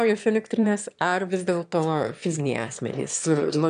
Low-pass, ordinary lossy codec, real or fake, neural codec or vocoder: 9.9 kHz; AAC, 96 kbps; fake; autoencoder, 22.05 kHz, a latent of 192 numbers a frame, VITS, trained on one speaker